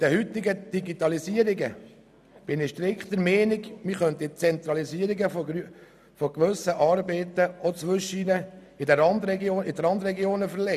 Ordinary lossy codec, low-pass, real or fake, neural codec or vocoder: none; 14.4 kHz; real; none